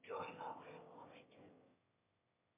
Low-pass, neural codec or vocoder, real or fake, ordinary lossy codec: 3.6 kHz; autoencoder, 22.05 kHz, a latent of 192 numbers a frame, VITS, trained on one speaker; fake; MP3, 24 kbps